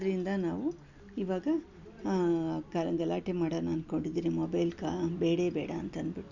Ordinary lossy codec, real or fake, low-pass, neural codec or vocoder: none; real; 7.2 kHz; none